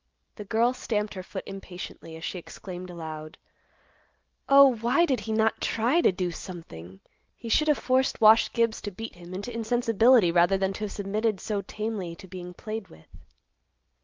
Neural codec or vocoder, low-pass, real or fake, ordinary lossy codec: none; 7.2 kHz; real; Opus, 24 kbps